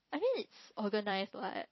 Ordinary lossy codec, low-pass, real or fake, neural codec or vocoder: MP3, 24 kbps; 7.2 kHz; fake; vocoder, 44.1 kHz, 128 mel bands every 256 samples, BigVGAN v2